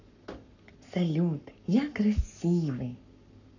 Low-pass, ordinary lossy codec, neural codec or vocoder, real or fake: 7.2 kHz; none; codec, 44.1 kHz, 7.8 kbps, Pupu-Codec; fake